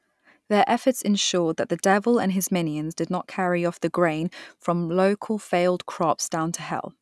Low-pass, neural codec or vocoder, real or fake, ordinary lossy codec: none; none; real; none